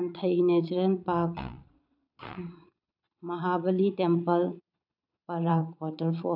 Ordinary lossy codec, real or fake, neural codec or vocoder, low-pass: none; fake; vocoder, 22.05 kHz, 80 mel bands, Vocos; 5.4 kHz